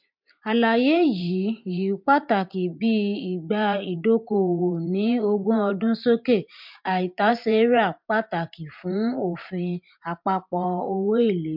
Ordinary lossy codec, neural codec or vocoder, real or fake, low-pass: MP3, 48 kbps; vocoder, 44.1 kHz, 128 mel bands every 512 samples, BigVGAN v2; fake; 5.4 kHz